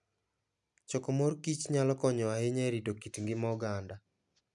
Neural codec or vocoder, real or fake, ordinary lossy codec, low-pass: none; real; none; 10.8 kHz